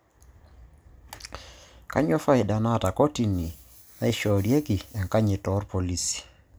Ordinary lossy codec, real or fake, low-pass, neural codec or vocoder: none; real; none; none